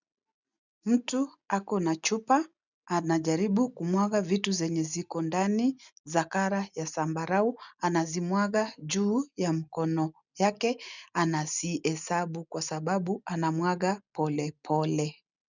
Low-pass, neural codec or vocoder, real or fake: 7.2 kHz; none; real